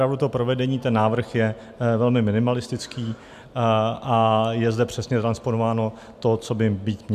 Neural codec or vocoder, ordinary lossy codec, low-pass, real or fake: none; MP3, 96 kbps; 14.4 kHz; real